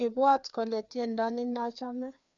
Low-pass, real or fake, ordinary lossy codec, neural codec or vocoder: 7.2 kHz; fake; none; codec, 16 kHz, 2 kbps, FreqCodec, larger model